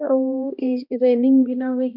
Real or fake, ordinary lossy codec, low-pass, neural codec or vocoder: fake; none; 5.4 kHz; codec, 16 kHz, 1 kbps, X-Codec, HuBERT features, trained on balanced general audio